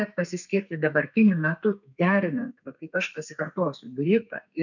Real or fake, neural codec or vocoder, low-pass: fake; codec, 32 kHz, 1.9 kbps, SNAC; 7.2 kHz